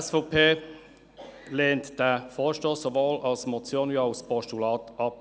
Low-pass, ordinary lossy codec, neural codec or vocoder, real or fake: none; none; none; real